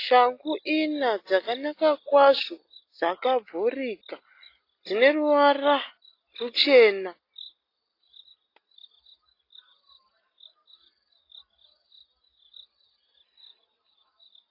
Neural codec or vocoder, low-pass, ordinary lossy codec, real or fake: none; 5.4 kHz; AAC, 32 kbps; real